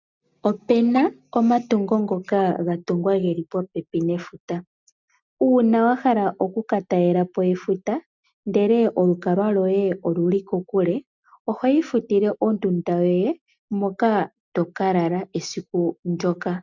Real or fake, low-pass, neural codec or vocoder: real; 7.2 kHz; none